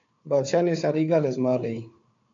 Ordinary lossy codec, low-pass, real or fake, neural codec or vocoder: AAC, 48 kbps; 7.2 kHz; fake; codec, 16 kHz, 4 kbps, FunCodec, trained on Chinese and English, 50 frames a second